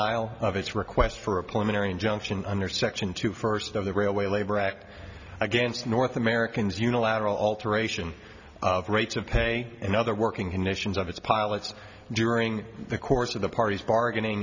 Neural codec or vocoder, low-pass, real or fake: none; 7.2 kHz; real